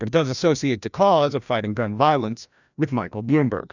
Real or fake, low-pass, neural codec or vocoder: fake; 7.2 kHz; codec, 16 kHz, 1 kbps, FreqCodec, larger model